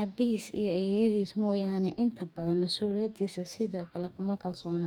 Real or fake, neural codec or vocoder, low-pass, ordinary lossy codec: fake; codec, 44.1 kHz, 2.6 kbps, DAC; 19.8 kHz; none